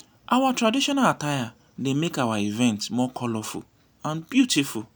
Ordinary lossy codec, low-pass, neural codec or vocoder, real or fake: none; none; none; real